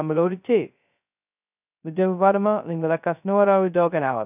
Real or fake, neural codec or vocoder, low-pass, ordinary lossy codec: fake; codec, 16 kHz, 0.2 kbps, FocalCodec; 3.6 kHz; none